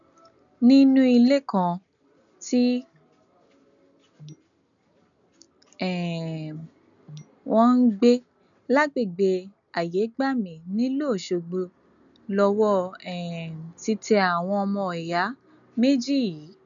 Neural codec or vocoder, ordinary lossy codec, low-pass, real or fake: none; none; 7.2 kHz; real